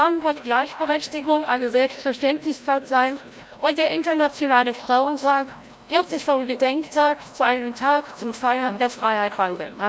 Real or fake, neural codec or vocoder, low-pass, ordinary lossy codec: fake; codec, 16 kHz, 0.5 kbps, FreqCodec, larger model; none; none